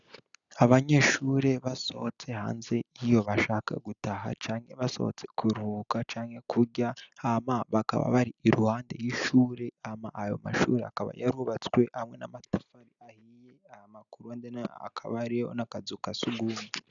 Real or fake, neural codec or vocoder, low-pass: real; none; 7.2 kHz